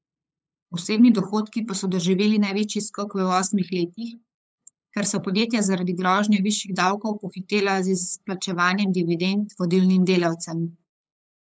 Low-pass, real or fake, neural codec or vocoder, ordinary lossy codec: none; fake; codec, 16 kHz, 8 kbps, FunCodec, trained on LibriTTS, 25 frames a second; none